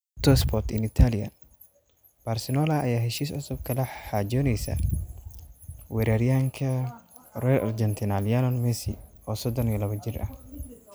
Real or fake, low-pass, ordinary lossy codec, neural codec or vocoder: real; none; none; none